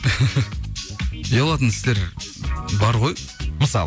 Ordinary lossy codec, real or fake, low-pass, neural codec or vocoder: none; real; none; none